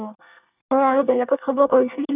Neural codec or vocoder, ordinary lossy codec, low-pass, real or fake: codec, 24 kHz, 1 kbps, SNAC; none; 3.6 kHz; fake